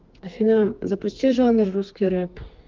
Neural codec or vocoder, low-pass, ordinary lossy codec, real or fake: codec, 32 kHz, 1.9 kbps, SNAC; 7.2 kHz; Opus, 32 kbps; fake